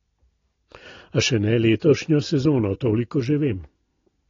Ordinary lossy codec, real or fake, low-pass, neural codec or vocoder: AAC, 32 kbps; real; 7.2 kHz; none